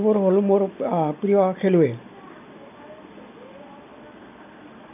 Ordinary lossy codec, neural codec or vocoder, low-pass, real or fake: MP3, 32 kbps; none; 3.6 kHz; real